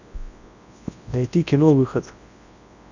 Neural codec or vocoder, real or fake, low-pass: codec, 24 kHz, 0.9 kbps, WavTokenizer, large speech release; fake; 7.2 kHz